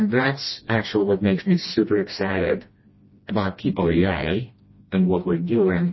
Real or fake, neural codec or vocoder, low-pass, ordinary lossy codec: fake; codec, 16 kHz, 1 kbps, FreqCodec, smaller model; 7.2 kHz; MP3, 24 kbps